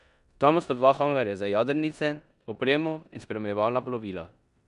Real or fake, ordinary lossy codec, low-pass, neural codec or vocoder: fake; none; 10.8 kHz; codec, 16 kHz in and 24 kHz out, 0.9 kbps, LongCat-Audio-Codec, four codebook decoder